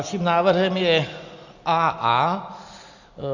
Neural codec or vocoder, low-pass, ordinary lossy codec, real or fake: none; 7.2 kHz; Opus, 64 kbps; real